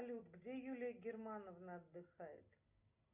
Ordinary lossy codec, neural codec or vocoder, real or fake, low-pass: Opus, 64 kbps; none; real; 3.6 kHz